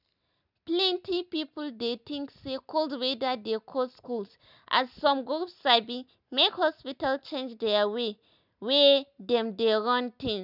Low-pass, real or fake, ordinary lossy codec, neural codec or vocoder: 5.4 kHz; real; none; none